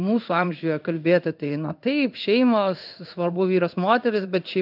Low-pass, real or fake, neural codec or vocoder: 5.4 kHz; fake; codec, 16 kHz in and 24 kHz out, 1 kbps, XY-Tokenizer